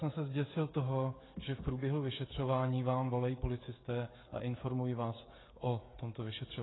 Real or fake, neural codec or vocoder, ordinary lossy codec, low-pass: fake; codec, 16 kHz, 16 kbps, FreqCodec, smaller model; AAC, 16 kbps; 7.2 kHz